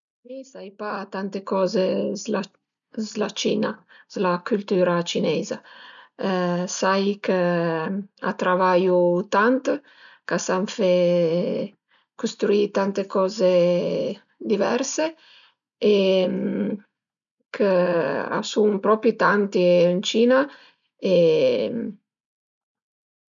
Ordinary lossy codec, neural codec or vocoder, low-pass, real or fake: none; none; 7.2 kHz; real